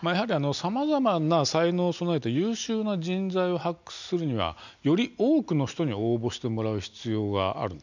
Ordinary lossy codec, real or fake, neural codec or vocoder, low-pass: none; real; none; 7.2 kHz